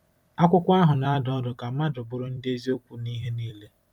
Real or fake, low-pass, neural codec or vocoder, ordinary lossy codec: fake; 19.8 kHz; vocoder, 44.1 kHz, 128 mel bands every 256 samples, BigVGAN v2; none